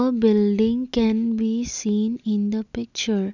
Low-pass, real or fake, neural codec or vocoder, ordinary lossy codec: 7.2 kHz; real; none; none